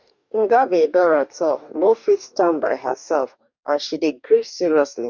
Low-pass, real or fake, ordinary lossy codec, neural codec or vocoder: 7.2 kHz; fake; none; codec, 44.1 kHz, 2.6 kbps, DAC